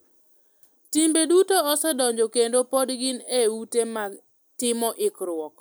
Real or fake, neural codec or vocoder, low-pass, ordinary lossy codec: real; none; none; none